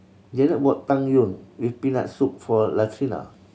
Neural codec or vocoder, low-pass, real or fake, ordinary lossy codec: none; none; real; none